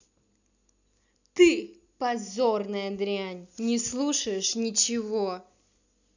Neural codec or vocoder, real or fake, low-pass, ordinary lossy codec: none; real; 7.2 kHz; none